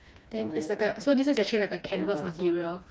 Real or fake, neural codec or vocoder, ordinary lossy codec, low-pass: fake; codec, 16 kHz, 2 kbps, FreqCodec, smaller model; none; none